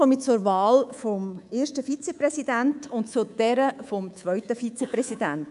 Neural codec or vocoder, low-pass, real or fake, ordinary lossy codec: codec, 24 kHz, 3.1 kbps, DualCodec; 10.8 kHz; fake; none